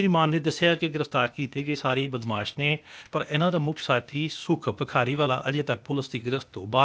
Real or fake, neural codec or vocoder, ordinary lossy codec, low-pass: fake; codec, 16 kHz, 0.8 kbps, ZipCodec; none; none